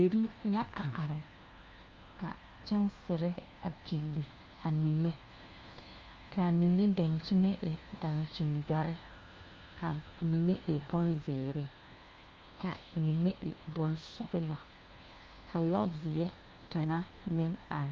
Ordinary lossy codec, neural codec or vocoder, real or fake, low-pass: Opus, 32 kbps; codec, 16 kHz, 1 kbps, FunCodec, trained on LibriTTS, 50 frames a second; fake; 7.2 kHz